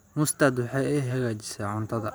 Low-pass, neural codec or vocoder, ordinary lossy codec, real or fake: none; vocoder, 44.1 kHz, 128 mel bands every 512 samples, BigVGAN v2; none; fake